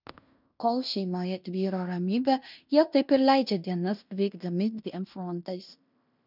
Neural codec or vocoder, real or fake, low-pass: codec, 16 kHz in and 24 kHz out, 0.9 kbps, LongCat-Audio-Codec, fine tuned four codebook decoder; fake; 5.4 kHz